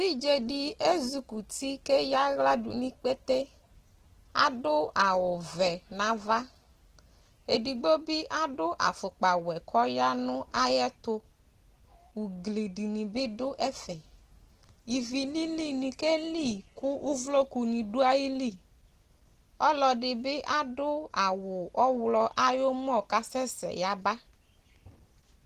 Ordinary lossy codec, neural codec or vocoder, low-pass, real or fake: Opus, 16 kbps; none; 14.4 kHz; real